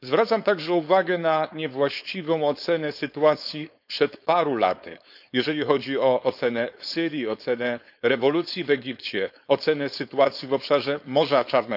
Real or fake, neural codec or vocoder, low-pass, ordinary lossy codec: fake; codec, 16 kHz, 4.8 kbps, FACodec; 5.4 kHz; none